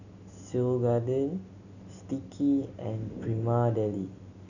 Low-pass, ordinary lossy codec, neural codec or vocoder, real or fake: 7.2 kHz; none; none; real